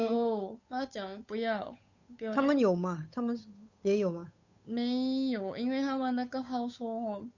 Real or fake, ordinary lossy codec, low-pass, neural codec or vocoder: fake; none; 7.2 kHz; codec, 16 kHz, 8 kbps, FunCodec, trained on Chinese and English, 25 frames a second